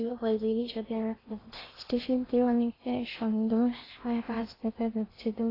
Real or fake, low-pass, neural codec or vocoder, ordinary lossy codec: fake; 5.4 kHz; codec, 16 kHz in and 24 kHz out, 0.6 kbps, FocalCodec, streaming, 4096 codes; AAC, 24 kbps